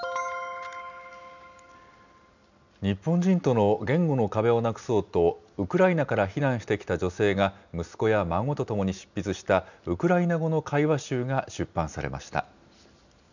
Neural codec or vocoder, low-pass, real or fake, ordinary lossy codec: none; 7.2 kHz; real; none